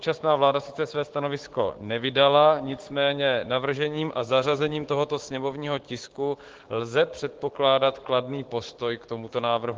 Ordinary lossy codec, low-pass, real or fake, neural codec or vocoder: Opus, 16 kbps; 7.2 kHz; fake; codec, 16 kHz, 6 kbps, DAC